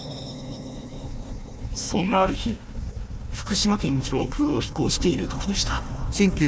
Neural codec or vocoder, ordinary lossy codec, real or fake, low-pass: codec, 16 kHz, 1 kbps, FunCodec, trained on Chinese and English, 50 frames a second; none; fake; none